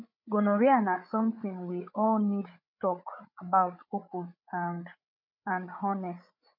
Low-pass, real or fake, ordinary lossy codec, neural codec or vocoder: 5.4 kHz; fake; MP3, 48 kbps; codec, 16 kHz, 8 kbps, FreqCodec, larger model